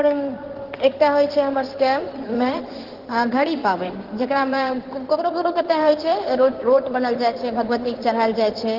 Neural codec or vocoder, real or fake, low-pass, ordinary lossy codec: codec, 16 kHz in and 24 kHz out, 2.2 kbps, FireRedTTS-2 codec; fake; 5.4 kHz; Opus, 16 kbps